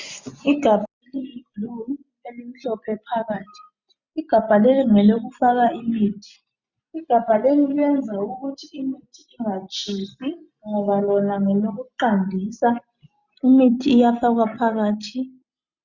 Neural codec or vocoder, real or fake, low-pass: none; real; 7.2 kHz